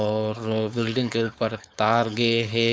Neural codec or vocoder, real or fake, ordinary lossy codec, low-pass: codec, 16 kHz, 4.8 kbps, FACodec; fake; none; none